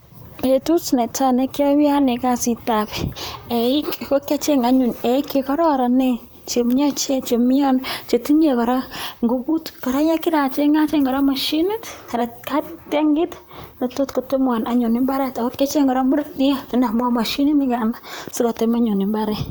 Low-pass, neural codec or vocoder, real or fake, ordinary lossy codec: none; vocoder, 44.1 kHz, 128 mel bands, Pupu-Vocoder; fake; none